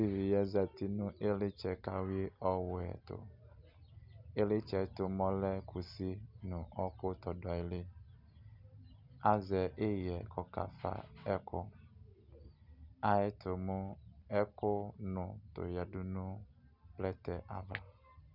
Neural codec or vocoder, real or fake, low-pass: none; real; 5.4 kHz